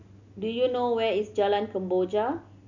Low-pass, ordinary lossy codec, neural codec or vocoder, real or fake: 7.2 kHz; none; none; real